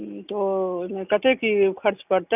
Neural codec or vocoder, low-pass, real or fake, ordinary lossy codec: none; 3.6 kHz; real; none